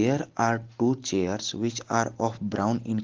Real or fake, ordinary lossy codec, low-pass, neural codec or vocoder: real; Opus, 16 kbps; 7.2 kHz; none